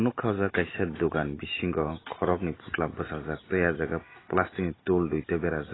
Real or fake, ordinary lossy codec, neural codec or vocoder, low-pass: real; AAC, 16 kbps; none; 7.2 kHz